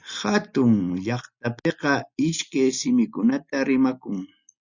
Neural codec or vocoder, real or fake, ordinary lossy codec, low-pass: none; real; Opus, 64 kbps; 7.2 kHz